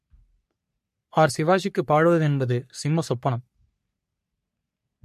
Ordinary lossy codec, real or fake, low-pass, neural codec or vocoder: MP3, 64 kbps; fake; 14.4 kHz; codec, 44.1 kHz, 3.4 kbps, Pupu-Codec